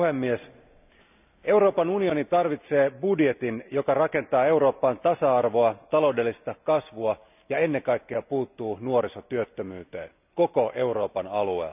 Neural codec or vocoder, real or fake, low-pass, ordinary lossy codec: none; real; 3.6 kHz; none